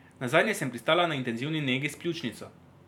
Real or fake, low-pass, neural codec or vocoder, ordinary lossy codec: fake; 19.8 kHz; vocoder, 44.1 kHz, 128 mel bands every 512 samples, BigVGAN v2; none